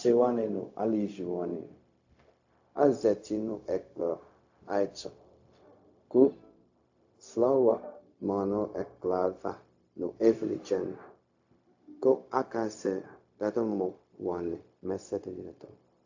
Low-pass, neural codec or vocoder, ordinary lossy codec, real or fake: 7.2 kHz; codec, 16 kHz, 0.4 kbps, LongCat-Audio-Codec; AAC, 48 kbps; fake